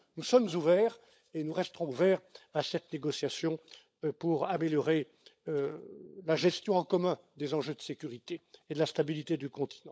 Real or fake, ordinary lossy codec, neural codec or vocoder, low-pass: fake; none; codec, 16 kHz, 16 kbps, FunCodec, trained on LibriTTS, 50 frames a second; none